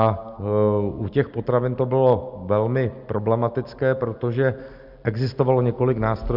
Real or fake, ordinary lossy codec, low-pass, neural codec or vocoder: real; Opus, 64 kbps; 5.4 kHz; none